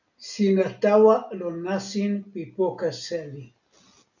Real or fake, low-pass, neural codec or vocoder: real; 7.2 kHz; none